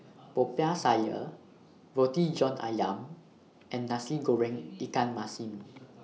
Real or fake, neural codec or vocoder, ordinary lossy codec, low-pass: real; none; none; none